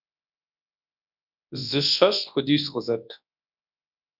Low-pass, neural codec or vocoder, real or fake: 5.4 kHz; codec, 24 kHz, 0.9 kbps, WavTokenizer, large speech release; fake